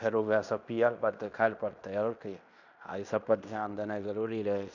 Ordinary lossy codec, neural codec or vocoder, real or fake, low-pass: none; codec, 16 kHz in and 24 kHz out, 0.9 kbps, LongCat-Audio-Codec, fine tuned four codebook decoder; fake; 7.2 kHz